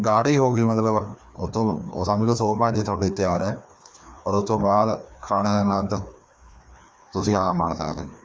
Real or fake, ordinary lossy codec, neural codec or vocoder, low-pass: fake; none; codec, 16 kHz, 2 kbps, FreqCodec, larger model; none